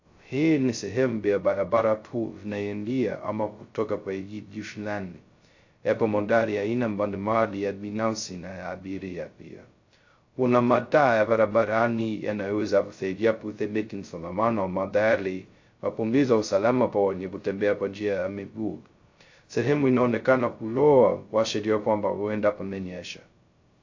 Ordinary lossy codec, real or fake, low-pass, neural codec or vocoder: AAC, 48 kbps; fake; 7.2 kHz; codec, 16 kHz, 0.2 kbps, FocalCodec